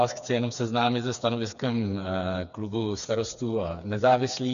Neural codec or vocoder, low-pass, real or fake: codec, 16 kHz, 4 kbps, FreqCodec, smaller model; 7.2 kHz; fake